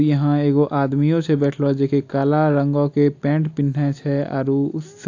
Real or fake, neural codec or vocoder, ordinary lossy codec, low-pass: real; none; AAC, 48 kbps; 7.2 kHz